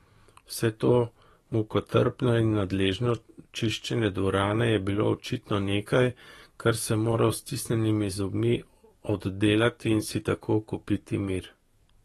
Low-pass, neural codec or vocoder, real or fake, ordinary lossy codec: 19.8 kHz; codec, 44.1 kHz, 7.8 kbps, DAC; fake; AAC, 32 kbps